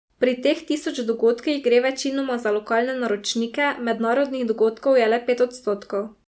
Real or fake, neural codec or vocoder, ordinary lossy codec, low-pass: real; none; none; none